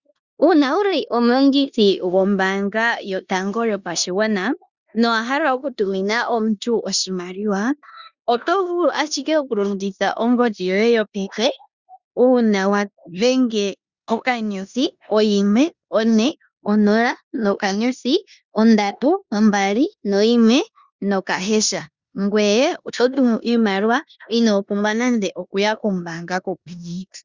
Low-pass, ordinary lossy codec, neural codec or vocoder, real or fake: 7.2 kHz; Opus, 64 kbps; codec, 16 kHz in and 24 kHz out, 0.9 kbps, LongCat-Audio-Codec, four codebook decoder; fake